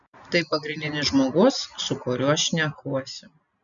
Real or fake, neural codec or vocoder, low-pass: real; none; 7.2 kHz